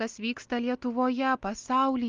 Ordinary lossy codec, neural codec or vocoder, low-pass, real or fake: Opus, 32 kbps; none; 7.2 kHz; real